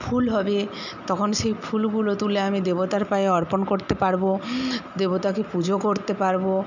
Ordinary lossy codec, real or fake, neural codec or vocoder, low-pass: none; real; none; 7.2 kHz